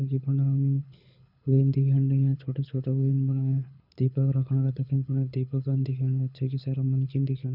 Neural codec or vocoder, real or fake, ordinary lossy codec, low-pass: codec, 24 kHz, 6 kbps, HILCodec; fake; none; 5.4 kHz